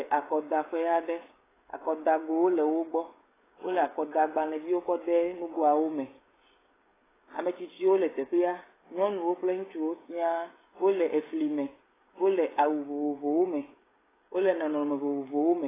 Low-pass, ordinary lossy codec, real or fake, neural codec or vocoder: 3.6 kHz; AAC, 16 kbps; fake; codec, 16 kHz, 16 kbps, FreqCodec, smaller model